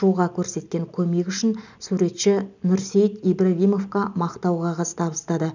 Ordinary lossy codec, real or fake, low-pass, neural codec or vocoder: none; real; 7.2 kHz; none